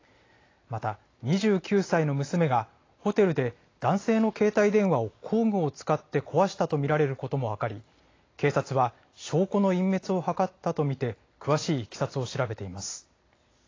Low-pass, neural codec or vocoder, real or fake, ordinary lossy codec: 7.2 kHz; none; real; AAC, 32 kbps